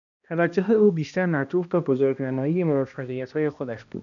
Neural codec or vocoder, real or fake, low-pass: codec, 16 kHz, 1 kbps, X-Codec, HuBERT features, trained on balanced general audio; fake; 7.2 kHz